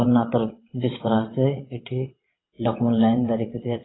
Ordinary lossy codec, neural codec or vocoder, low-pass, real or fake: AAC, 16 kbps; vocoder, 22.05 kHz, 80 mel bands, WaveNeXt; 7.2 kHz; fake